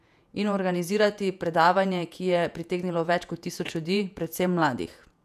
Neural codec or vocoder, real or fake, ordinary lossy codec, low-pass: vocoder, 48 kHz, 128 mel bands, Vocos; fake; none; 14.4 kHz